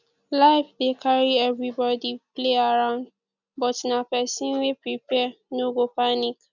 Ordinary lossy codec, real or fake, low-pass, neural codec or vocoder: none; real; 7.2 kHz; none